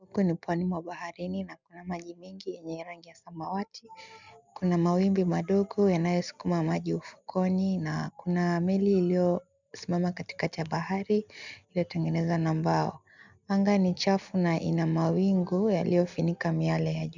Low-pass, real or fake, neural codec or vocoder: 7.2 kHz; real; none